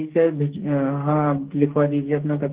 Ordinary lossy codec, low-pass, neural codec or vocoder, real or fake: Opus, 16 kbps; 3.6 kHz; codec, 44.1 kHz, 2.6 kbps, SNAC; fake